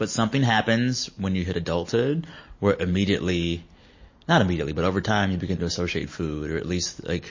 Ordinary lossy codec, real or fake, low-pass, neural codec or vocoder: MP3, 32 kbps; real; 7.2 kHz; none